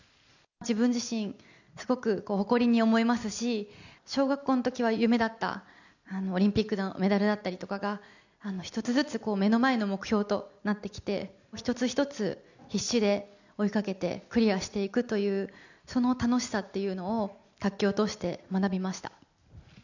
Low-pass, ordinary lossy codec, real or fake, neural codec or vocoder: 7.2 kHz; none; real; none